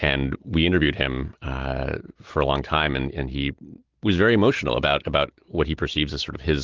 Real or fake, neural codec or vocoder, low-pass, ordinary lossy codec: real; none; 7.2 kHz; Opus, 16 kbps